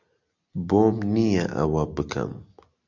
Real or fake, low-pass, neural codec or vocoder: real; 7.2 kHz; none